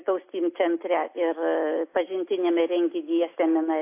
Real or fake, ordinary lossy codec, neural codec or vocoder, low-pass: real; AAC, 24 kbps; none; 3.6 kHz